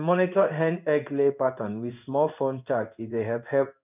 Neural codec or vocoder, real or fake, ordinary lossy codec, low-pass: codec, 16 kHz in and 24 kHz out, 1 kbps, XY-Tokenizer; fake; none; 3.6 kHz